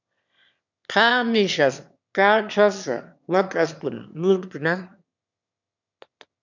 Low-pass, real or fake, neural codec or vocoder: 7.2 kHz; fake; autoencoder, 22.05 kHz, a latent of 192 numbers a frame, VITS, trained on one speaker